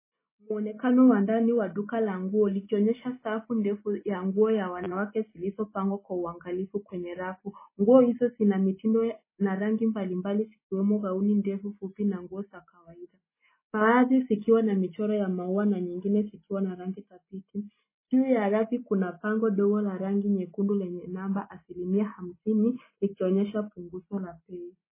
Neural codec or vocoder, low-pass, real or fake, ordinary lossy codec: none; 3.6 kHz; real; MP3, 16 kbps